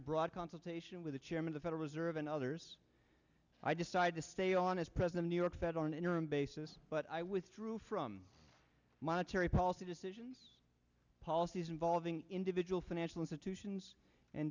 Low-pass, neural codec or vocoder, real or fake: 7.2 kHz; none; real